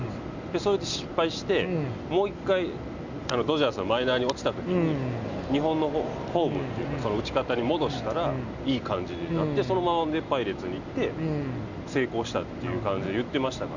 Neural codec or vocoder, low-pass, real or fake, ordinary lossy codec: none; 7.2 kHz; real; none